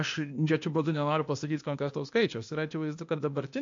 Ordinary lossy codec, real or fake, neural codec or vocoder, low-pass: MP3, 48 kbps; fake; codec, 16 kHz, 0.8 kbps, ZipCodec; 7.2 kHz